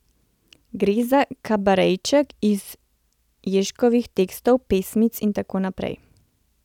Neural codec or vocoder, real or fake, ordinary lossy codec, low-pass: none; real; none; 19.8 kHz